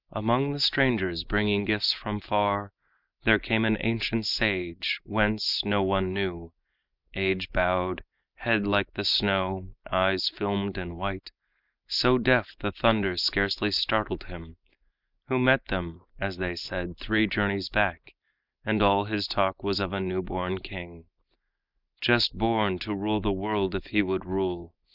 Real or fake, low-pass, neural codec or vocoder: real; 5.4 kHz; none